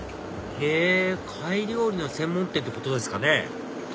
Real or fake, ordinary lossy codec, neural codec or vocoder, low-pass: real; none; none; none